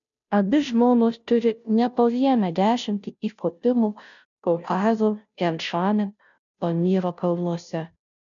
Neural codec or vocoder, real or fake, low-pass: codec, 16 kHz, 0.5 kbps, FunCodec, trained on Chinese and English, 25 frames a second; fake; 7.2 kHz